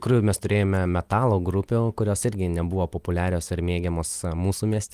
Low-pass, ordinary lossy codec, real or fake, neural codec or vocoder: 14.4 kHz; Opus, 24 kbps; real; none